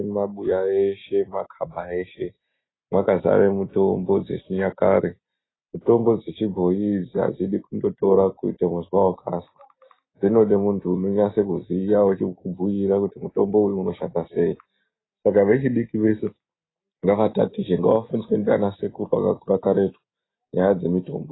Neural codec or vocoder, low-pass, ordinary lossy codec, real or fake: none; 7.2 kHz; AAC, 16 kbps; real